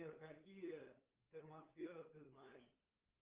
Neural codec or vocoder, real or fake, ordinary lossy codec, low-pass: codec, 16 kHz, 8 kbps, FunCodec, trained on LibriTTS, 25 frames a second; fake; Opus, 24 kbps; 3.6 kHz